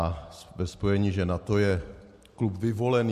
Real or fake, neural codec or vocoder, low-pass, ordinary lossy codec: real; none; 14.4 kHz; MP3, 64 kbps